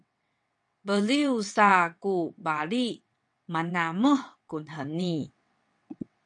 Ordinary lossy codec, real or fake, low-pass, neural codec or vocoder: MP3, 96 kbps; fake; 9.9 kHz; vocoder, 22.05 kHz, 80 mel bands, WaveNeXt